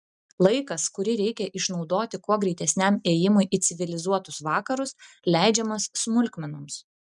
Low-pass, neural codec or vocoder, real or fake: 10.8 kHz; none; real